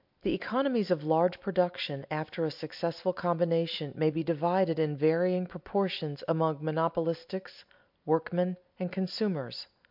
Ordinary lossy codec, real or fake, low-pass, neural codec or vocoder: MP3, 48 kbps; real; 5.4 kHz; none